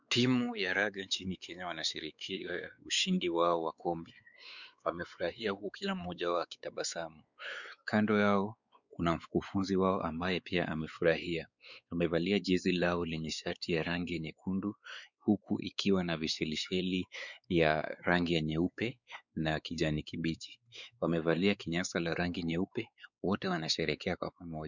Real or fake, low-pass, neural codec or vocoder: fake; 7.2 kHz; codec, 16 kHz, 4 kbps, X-Codec, WavLM features, trained on Multilingual LibriSpeech